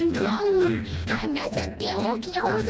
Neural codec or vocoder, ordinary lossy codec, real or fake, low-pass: codec, 16 kHz, 1 kbps, FreqCodec, smaller model; none; fake; none